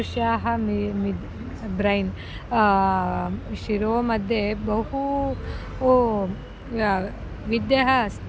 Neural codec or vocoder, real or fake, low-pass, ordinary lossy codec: none; real; none; none